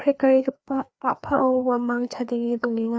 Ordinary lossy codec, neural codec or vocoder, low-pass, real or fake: none; codec, 16 kHz, 2 kbps, FreqCodec, larger model; none; fake